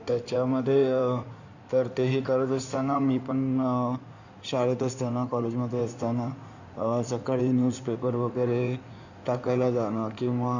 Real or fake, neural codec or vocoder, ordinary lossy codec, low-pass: fake; codec, 16 kHz in and 24 kHz out, 2.2 kbps, FireRedTTS-2 codec; none; 7.2 kHz